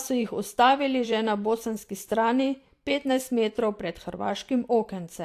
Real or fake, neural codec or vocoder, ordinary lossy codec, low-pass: fake; vocoder, 44.1 kHz, 128 mel bands every 512 samples, BigVGAN v2; AAC, 64 kbps; 14.4 kHz